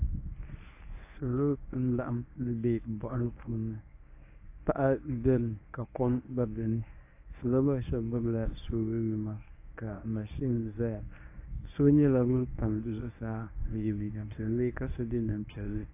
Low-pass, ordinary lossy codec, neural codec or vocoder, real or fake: 3.6 kHz; AAC, 24 kbps; codec, 24 kHz, 0.9 kbps, WavTokenizer, medium speech release version 1; fake